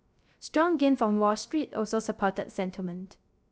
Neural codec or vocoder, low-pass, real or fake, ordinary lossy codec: codec, 16 kHz, 0.3 kbps, FocalCodec; none; fake; none